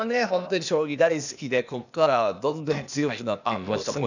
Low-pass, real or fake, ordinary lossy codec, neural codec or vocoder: 7.2 kHz; fake; none; codec, 16 kHz, 0.8 kbps, ZipCodec